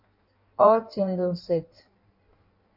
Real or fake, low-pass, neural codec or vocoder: fake; 5.4 kHz; codec, 16 kHz in and 24 kHz out, 1.1 kbps, FireRedTTS-2 codec